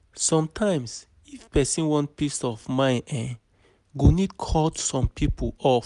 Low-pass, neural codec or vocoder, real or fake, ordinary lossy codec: 10.8 kHz; none; real; none